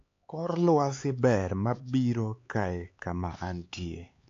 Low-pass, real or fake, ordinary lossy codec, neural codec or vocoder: 7.2 kHz; fake; AAC, 48 kbps; codec, 16 kHz, 4 kbps, X-Codec, HuBERT features, trained on LibriSpeech